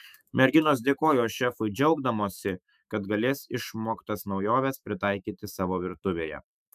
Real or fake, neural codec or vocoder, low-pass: fake; autoencoder, 48 kHz, 128 numbers a frame, DAC-VAE, trained on Japanese speech; 14.4 kHz